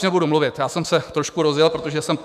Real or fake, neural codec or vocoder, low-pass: fake; autoencoder, 48 kHz, 128 numbers a frame, DAC-VAE, trained on Japanese speech; 14.4 kHz